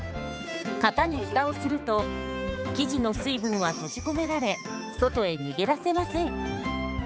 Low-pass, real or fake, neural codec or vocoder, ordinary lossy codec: none; fake; codec, 16 kHz, 4 kbps, X-Codec, HuBERT features, trained on balanced general audio; none